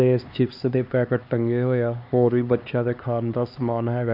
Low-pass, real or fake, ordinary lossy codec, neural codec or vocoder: 5.4 kHz; fake; none; codec, 16 kHz, 2 kbps, X-Codec, HuBERT features, trained on LibriSpeech